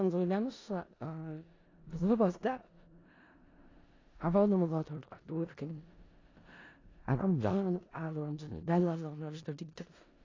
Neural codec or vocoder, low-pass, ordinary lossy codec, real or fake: codec, 16 kHz in and 24 kHz out, 0.4 kbps, LongCat-Audio-Codec, four codebook decoder; 7.2 kHz; AAC, 32 kbps; fake